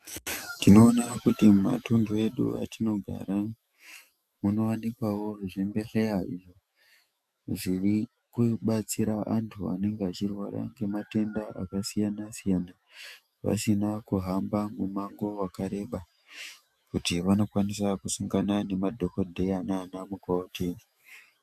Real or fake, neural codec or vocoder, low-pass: fake; autoencoder, 48 kHz, 128 numbers a frame, DAC-VAE, trained on Japanese speech; 14.4 kHz